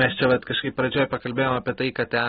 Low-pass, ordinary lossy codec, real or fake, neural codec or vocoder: 7.2 kHz; AAC, 16 kbps; real; none